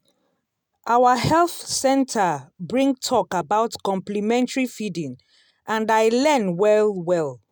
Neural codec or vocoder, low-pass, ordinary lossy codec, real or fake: none; none; none; real